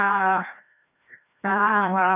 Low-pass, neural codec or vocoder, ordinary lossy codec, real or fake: 3.6 kHz; codec, 16 kHz, 1 kbps, FreqCodec, larger model; none; fake